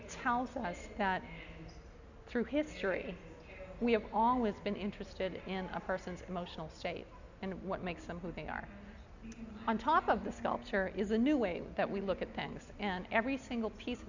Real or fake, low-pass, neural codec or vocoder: real; 7.2 kHz; none